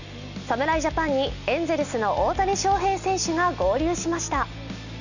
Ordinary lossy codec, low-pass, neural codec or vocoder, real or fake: none; 7.2 kHz; none; real